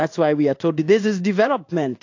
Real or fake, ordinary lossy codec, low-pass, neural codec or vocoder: fake; AAC, 48 kbps; 7.2 kHz; codec, 16 kHz in and 24 kHz out, 1 kbps, XY-Tokenizer